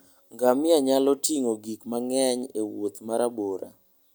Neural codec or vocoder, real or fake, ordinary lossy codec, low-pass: none; real; none; none